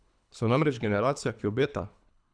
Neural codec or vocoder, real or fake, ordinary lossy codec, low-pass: codec, 24 kHz, 3 kbps, HILCodec; fake; none; 9.9 kHz